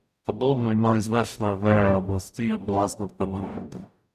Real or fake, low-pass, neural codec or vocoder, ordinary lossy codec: fake; 14.4 kHz; codec, 44.1 kHz, 0.9 kbps, DAC; none